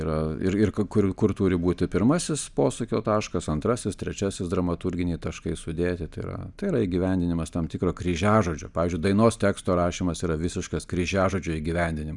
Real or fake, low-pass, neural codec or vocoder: real; 10.8 kHz; none